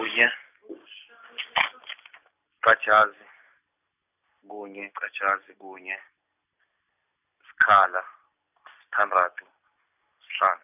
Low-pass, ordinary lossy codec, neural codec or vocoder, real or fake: 3.6 kHz; none; none; real